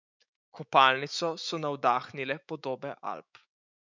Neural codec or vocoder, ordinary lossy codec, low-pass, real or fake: autoencoder, 48 kHz, 128 numbers a frame, DAC-VAE, trained on Japanese speech; none; 7.2 kHz; fake